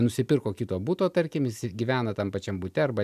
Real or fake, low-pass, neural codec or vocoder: real; 14.4 kHz; none